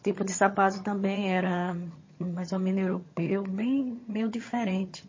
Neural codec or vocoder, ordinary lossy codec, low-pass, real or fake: vocoder, 22.05 kHz, 80 mel bands, HiFi-GAN; MP3, 32 kbps; 7.2 kHz; fake